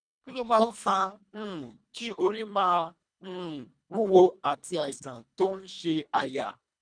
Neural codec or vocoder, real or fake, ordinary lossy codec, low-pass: codec, 24 kHz, 1.5 kbps, HILCodec; fake; none; 9.9 kHz